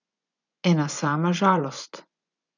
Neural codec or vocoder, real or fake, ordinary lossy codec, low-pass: vocoder, 24 kHz, 100 mel bands, Vocos; fake; none; 7.2 kHz